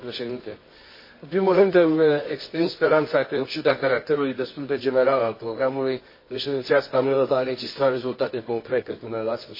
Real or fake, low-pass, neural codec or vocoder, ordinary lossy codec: fake; 5.4 kHz; codec, 24 kHz, 0.9 kbps, WavTokenizer, medium music audio release; MP3, 24 kbps